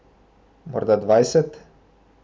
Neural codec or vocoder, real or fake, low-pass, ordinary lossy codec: none; real; none; none